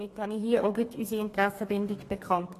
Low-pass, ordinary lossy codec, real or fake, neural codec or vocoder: 14.4 kHz; AAC, 48 kbps; fake; codec, 32 kHz, 1.9 kbps, SNAC